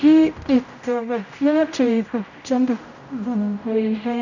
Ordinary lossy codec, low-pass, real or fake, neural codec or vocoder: none; 7.2 kHz; fake; codec, 16 kHz, 0.5 kbps, X-Codec, HuBERT features, trained on general audio